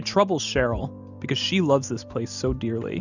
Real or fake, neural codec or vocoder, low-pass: real; none; 7.2 kHz